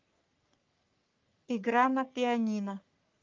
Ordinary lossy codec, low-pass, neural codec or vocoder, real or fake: Opus, 32 kbps; 7.2 kHz; codec, 44.1 kHz, 3.4 kbps, Pupu-Codec; fake